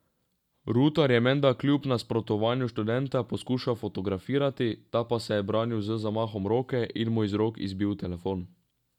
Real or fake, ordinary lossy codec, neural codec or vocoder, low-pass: real; none; none; 19.8 kHz